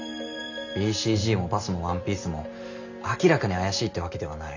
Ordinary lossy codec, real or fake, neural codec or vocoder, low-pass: none; real; none; 7.2 kHz